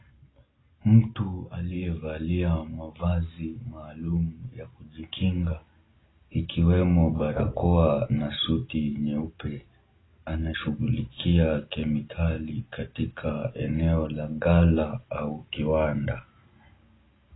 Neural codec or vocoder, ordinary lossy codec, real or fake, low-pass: none; AAC, 16 kbps; real; 7.2 kHz